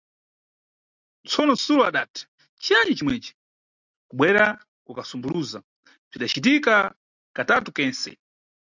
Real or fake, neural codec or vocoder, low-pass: real; none; 7.2 kHz